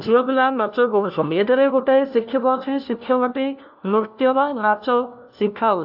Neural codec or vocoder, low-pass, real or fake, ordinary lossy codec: codec, 16 kHz, 1 kbps, FunCodec, trained on LibriTTS, 50 frames a second; 5.4 kHz; fake; AAC, 48 kbps